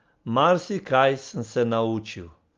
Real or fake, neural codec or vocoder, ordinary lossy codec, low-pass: real; none; Opus, 32 kbps; 7.2 kHz